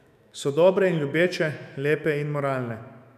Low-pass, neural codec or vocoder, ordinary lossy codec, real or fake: 14.4 kHz; autoencoder, 48 kHz, 128 numbers a frame, DAC-VAE, trained on Japanese speech; none; fake